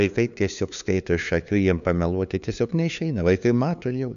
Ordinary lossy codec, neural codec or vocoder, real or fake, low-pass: MP3, 96 kbps; codec, 16 kHz, 2 kbps, FunCodec, trained on LibriTTS, 25 frames a second; fake; 7.2 kHz